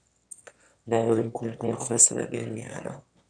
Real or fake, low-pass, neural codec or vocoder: fake; 9.9 kHz; autoencoder, 22.05 kHz, a latent of 192 numbers a frame, VITS, trained on one speaker